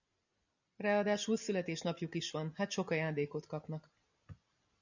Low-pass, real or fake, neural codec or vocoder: 7.2 kHz; real; none